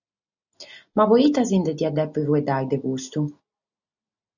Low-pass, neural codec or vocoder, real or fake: 7.2 kHz; none; real